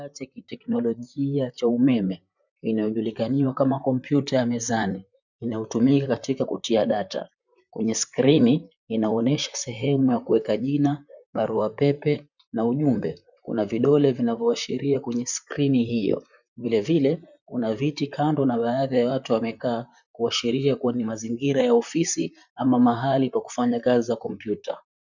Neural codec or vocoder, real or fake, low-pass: vocoder, 44.1 kHz, 128 mel bands, Pupu-Vocoder; fake; 7.2 kHz